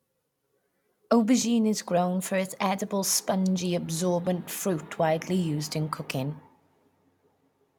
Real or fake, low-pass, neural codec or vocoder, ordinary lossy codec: real; 19.8 kHz; none; none